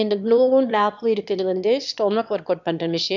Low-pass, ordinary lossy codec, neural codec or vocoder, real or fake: 7.2 kHz; none; autoencoder, 22.05 kHz, a latent of 192 numbers a frame, VITS, trained on one speaker; fake